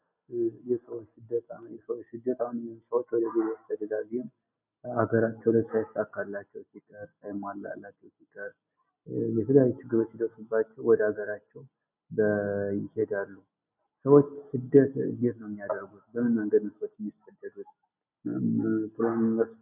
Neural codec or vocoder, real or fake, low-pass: none; real; 3.6 kHz